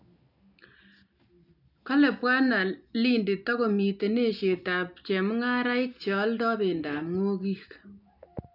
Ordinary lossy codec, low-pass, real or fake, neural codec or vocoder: none; 5.4 kHz; real; none